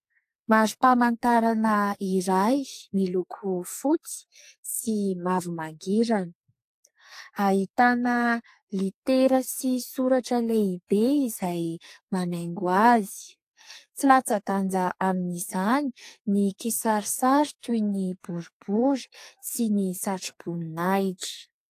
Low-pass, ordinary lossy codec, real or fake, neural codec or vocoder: 14.4 kHz; AAC, 64 kbps; fake; codec, 44.1 kHz, 2.6 kbps, SNAC